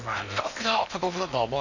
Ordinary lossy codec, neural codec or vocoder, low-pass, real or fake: none; codec, 16 kHz in and 24 kHz out, 0.8 kbps, FocalCodec, streaming, 65536 codes; 7.2 kHz; fake